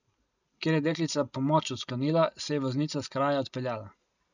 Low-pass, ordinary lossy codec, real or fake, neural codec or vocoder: 7.2 kHz; none; real; none